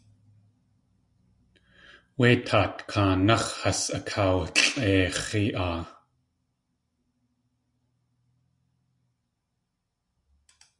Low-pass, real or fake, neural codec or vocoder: 10.8 kHz; real; none